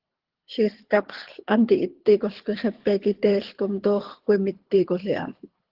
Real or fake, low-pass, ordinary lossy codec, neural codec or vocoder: fake; 5.4 kHz; Opus, 16 kbps; codec, 24 kHz, 6 kbps, HILCodec